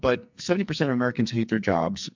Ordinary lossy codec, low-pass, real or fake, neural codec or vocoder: MP3, 64 kbps; 7.2 kHz; fake; codec, 44.1 kHz, 2.6 kbps, SNAC